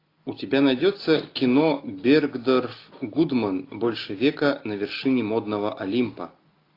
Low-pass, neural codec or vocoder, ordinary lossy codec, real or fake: 5.4 kHz; none; AAC, 32 kbps; real